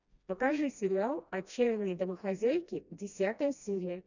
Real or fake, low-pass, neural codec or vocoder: fake; 7.2 kHz; codec, 16 kHz, 1 kbps, FreqCodec, smaller model